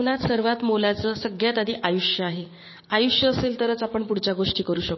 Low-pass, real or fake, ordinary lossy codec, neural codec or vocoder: 7.2 kHz; fake; MP3, 24 kbps; codec, 16 kHz, 6 kbps, DAC